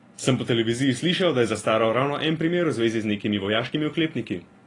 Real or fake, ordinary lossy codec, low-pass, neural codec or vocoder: real; AAC, 32 kbps; 10.8 kHz; none